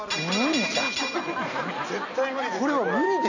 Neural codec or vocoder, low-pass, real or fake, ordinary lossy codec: none; 7.2 kHz; real; none